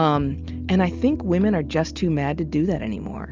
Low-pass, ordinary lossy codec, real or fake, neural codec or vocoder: 7.2 kHz; Opus, 32 kbps; real; none